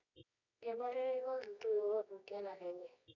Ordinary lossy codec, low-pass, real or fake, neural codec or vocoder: none; 7.2 kHz; fake; codec, 24 kHz, 0.9 kbps, WavTokenizer, medium music audio release